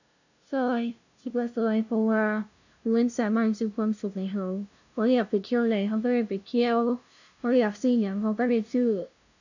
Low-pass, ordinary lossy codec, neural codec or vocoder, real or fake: 7.2 kHz; none; codec, 16 kHz, 0.5 kbps, FunCodec, trained on LibriTTS, 25 frames a second; fake